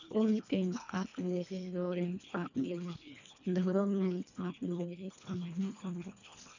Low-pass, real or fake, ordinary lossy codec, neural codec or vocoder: 7.2 kHz; fake; none; codec, 24 kHz, 1.5 kbps, HILCodec